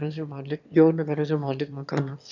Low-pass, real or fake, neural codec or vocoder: 7.2 kHz; fake; autoencoder, 22.05 kHz, a latent of 192 numbers a frame, VITS, trained on one speaker